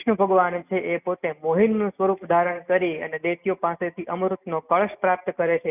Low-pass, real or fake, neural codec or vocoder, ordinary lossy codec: 3.6 kHz; real; none; none